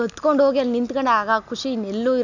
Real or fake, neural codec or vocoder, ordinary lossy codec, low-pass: real; none; none; 7.2 kHz